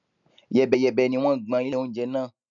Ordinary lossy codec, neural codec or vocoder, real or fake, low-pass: none; none; real; 7.2 kHz